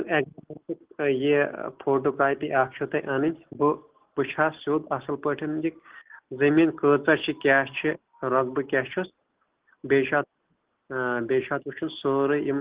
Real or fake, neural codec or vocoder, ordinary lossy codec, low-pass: real; none; Opus, 16 kbps; 3.6 kHz